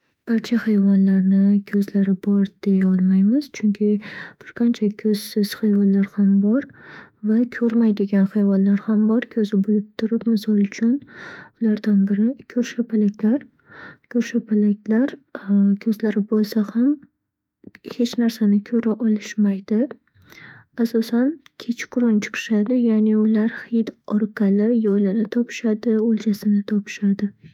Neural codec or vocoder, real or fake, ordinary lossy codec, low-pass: autoencoder, 48 kHz, 32 numbers a frame, DAC-VAE, trained on Japanese speech; fake; none; 19.8 kHz